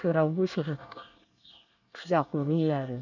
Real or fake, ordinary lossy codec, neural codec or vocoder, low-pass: fake; none; codec, 24 kHz, 1 kbps, SNAC; 7.2 kHz